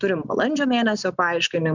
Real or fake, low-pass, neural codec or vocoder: real; 7.2 kHz; none